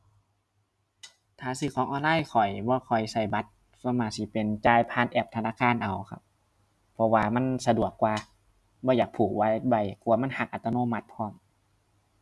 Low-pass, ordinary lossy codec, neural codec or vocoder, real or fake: none; none; none; real